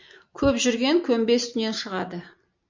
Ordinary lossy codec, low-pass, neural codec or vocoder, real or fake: MP3, 64 kbps; 7.2 kHz; none; real